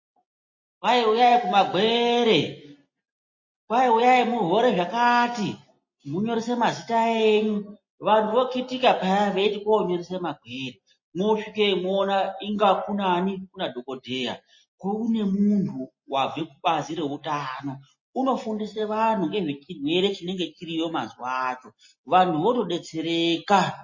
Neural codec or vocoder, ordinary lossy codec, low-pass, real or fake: none; MP3, 32 kbps; 7.2 kHz; real